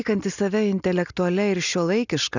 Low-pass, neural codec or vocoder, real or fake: 7.2 kHz; none; real